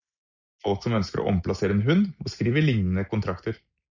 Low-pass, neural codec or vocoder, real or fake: 7.2 kHz; none; real